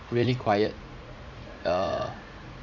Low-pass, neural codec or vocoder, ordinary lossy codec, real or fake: 7.2 kHz; vocoder, 44.1 kHz, 80 mel bands, Vocos; none; fake